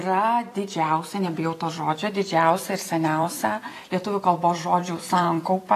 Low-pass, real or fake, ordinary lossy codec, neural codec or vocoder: 14.4 kHz; fake; AAC, 48 kbps; vocoder, 44.1 kHz, 128 mel bands every 256 samples, BigVGAN v2